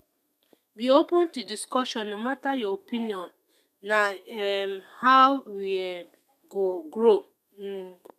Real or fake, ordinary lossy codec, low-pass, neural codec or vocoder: fake; none; 14.4 kHz; codec, 32 kHz, 1.9 kbps, SNAC